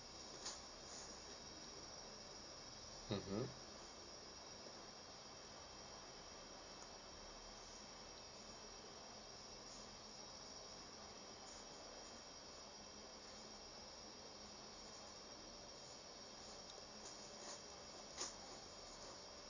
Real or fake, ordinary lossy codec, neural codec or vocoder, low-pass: real; Opus, 64 kbps; none; 7.2 kHz